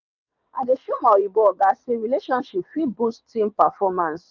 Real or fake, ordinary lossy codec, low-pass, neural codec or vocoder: fake; none; 7.2 kHz; vocoder, 22.05 kHz, 80 mel bands, WaveNeXt